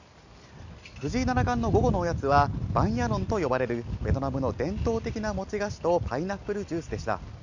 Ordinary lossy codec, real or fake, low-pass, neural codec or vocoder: none; real; 7.2 kHz; none